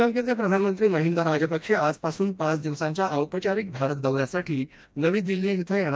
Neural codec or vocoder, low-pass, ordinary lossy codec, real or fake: codec, 16 kHz, 1 kbps, FreqCodec, smaller model; none; none; fake